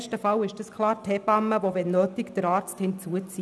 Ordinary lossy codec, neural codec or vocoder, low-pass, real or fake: none; none; none; real